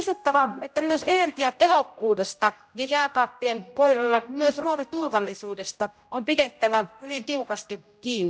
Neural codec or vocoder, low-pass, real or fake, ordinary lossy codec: codec, 16 kHz, 0.5 kbps, X-Codec, HuBERT features, trained on general audio; none; fake; none